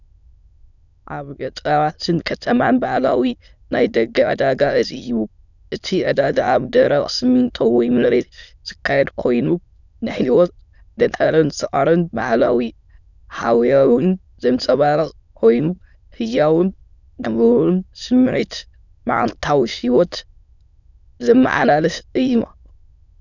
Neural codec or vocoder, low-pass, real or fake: autoencoder, 22.05 kHz, a latent of 192 numbers a frame, VITS, trained on many speakers; 7.2 kHz; fake